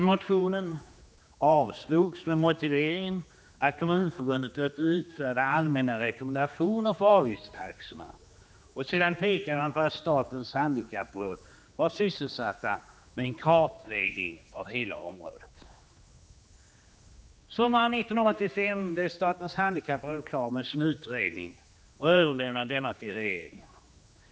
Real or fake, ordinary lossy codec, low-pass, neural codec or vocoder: fake; none; none; codec, 16 kHz, 2 kbps, X-Codec, HuBERT features, trained on general audio